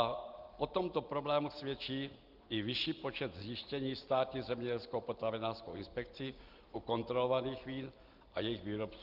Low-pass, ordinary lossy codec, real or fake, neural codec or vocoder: 5.4 kHz; Opus, 32 kbps; real; none